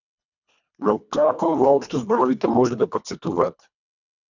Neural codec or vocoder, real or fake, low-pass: codec, 24 kHz, 1.5 kbps, HILCodec; fake; 7.2 kHz